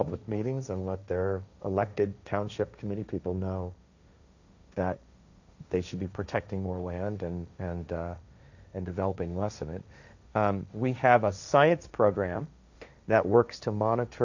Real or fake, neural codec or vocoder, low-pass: fake; codec, 16 kHz, 1.1 kbps, Voila-Tokenizer; 7.2 kHz